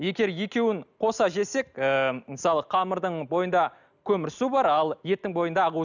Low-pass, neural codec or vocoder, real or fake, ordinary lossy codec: 7.2 kHz; none; real; none